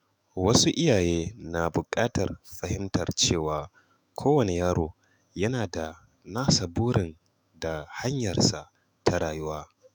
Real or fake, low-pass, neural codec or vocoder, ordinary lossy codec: fake; none; autoencoder, 48 kHz, 128 numbers a frame, DAC-VAE, trained on Japanese speech; none